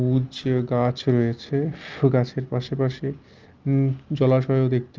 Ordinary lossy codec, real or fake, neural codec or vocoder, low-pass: Opus, 24 kbps; real; none; 7.2 kHz